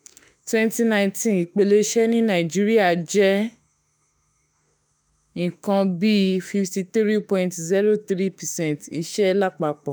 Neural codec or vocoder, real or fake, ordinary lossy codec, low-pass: autoencoder, 48 kHz, 32 numbers a frame, DAC-VAE, trained on Japanese speech; fake; none; none